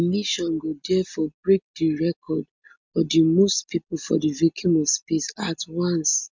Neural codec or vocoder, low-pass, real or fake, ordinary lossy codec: none; 7.2 kHz; real; MP3, 64 kbps